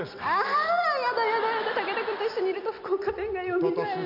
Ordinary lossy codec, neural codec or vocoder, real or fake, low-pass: none; none; real; 5.4 kHz